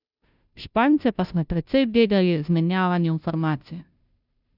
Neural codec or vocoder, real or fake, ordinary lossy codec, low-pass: codec, 16 kHz, 0.5 kbps, FunCodec, trained on Chinese and English, 25 frames a second; fake; none; 5.4 kHz